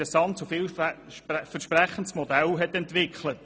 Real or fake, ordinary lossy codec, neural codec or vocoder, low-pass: real; none; none; none